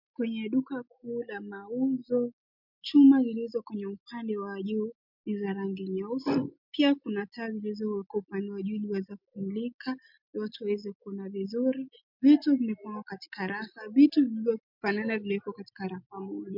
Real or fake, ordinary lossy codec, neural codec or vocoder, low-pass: real; MP3, 48 kbps; none; 5.4 kHz